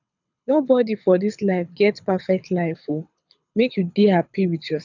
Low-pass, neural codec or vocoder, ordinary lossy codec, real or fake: 7.2 kHz; codec, 24 kHz, 6 kbps, HILCodec; none; fake